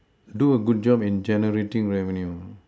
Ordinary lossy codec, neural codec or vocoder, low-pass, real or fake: none; none; none; real